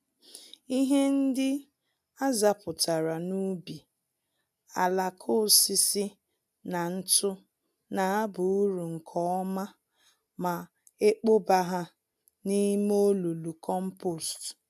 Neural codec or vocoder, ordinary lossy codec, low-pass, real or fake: none; none; 14.4 kHz; real